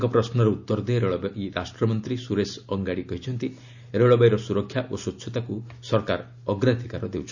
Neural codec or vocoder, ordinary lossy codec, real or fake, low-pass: none; none; real; 7.2 kHz